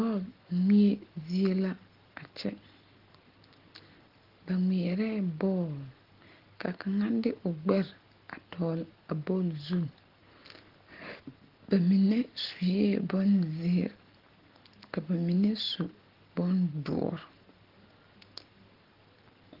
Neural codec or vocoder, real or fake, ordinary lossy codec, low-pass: none; real; Opus, 16 kbps; 5.4 kHz